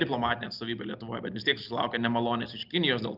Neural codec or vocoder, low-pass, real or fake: none; 5.4 kHz; real